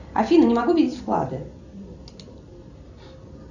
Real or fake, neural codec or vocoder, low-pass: real; none; 7.2 kHz